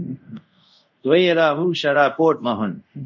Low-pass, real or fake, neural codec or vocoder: 7.2 kHz; fake; codec, 24 kHz, 0.5 kbps, DualCodec